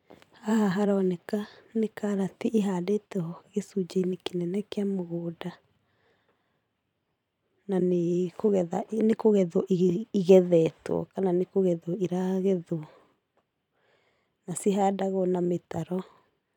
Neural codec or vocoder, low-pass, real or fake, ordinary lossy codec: vocoder, 44.1 kHz, 128 mel bands every 512 samples, BigVGAN v2; 19.8 kHz; fake; none